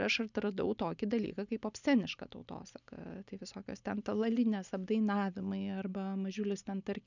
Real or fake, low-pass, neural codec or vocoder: real; 7.2 kHz; none